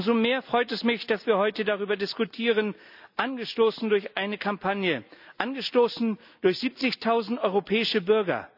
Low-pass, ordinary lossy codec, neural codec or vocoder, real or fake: 5.4 kHz; none; none; real